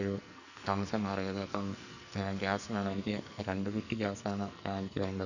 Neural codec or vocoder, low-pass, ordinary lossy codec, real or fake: codec, 44.1 kHz, 2.6 kbps, SNAC; 7.2 kHz; none; fake